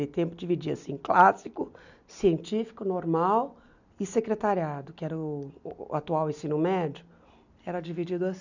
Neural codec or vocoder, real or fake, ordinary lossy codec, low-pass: none; real; none; 7.2 kHz